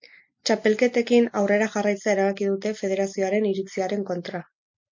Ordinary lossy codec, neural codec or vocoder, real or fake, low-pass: MP3, 48 kbps; none; real; 7.2 kHz